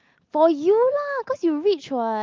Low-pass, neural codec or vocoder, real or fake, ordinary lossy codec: 7.2 kHz; none; real; Opus, 32 kbps